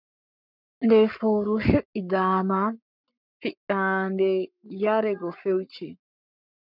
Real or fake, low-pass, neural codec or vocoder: fake; 5.4 kHz; codec, 44.1 kHz, 7.8 kbps, Pupu-Codec